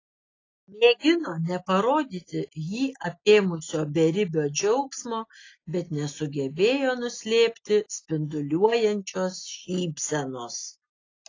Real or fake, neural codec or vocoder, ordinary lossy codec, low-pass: real; none; AAC, 32 kbps; 7.2 kHz